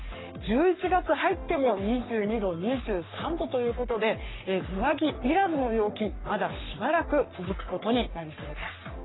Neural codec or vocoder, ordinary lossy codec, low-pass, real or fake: codec, 44.1 kHz, 3.4 kbps, Pupu-Codec; AAC, 16 kbps; 7.2 kHz; fake